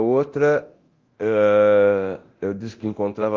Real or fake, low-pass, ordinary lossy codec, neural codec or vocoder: fake; 7.2 kHz; Opus, 32 kbps; codec, 24 kHz, 0.9 kbps, DualCodec